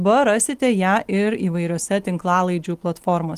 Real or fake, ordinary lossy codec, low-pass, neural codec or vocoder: real; Opus, 24 kbps; 14.4 kHz; none